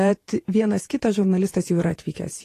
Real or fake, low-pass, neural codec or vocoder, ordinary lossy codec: fake; 14.4 kHz; vocoder, 48 kHz, 128 mel bands, Vocos; AAC, 48 kbps